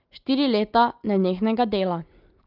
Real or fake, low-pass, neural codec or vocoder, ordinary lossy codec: real; 5.4 kHz; none; Opus, 24 kbps